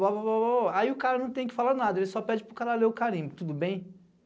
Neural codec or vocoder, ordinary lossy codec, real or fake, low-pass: none; none; real; none